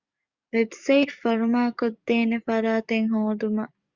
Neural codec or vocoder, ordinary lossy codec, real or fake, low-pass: codec, 44.1 kHz, 7.8 kbps, DAC; Opus, 64 kbps; fake; 7.2 kHz